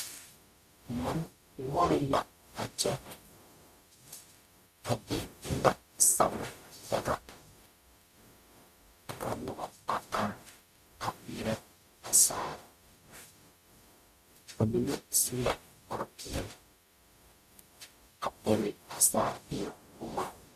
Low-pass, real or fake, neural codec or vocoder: 14.4 kHz; fake; codec, 44.1 kHz, 0.9 kbps, DAC